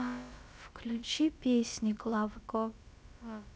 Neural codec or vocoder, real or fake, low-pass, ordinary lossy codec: codec, 16 kHz, about 1 kbps, DyCAST, with the encoder's durations; fake; none; none